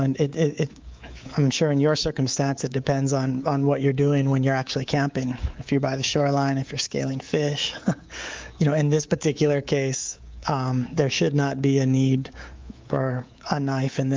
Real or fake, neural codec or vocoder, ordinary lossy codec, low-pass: fake; codec, 24 kHz, 3.1 kbps, DualCodec; Opus, 24 kbps; 7.2 kHz